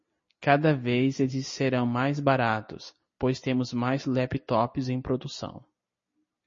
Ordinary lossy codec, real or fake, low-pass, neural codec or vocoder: MP3, 32 kbps; real; 7.2 kHz; none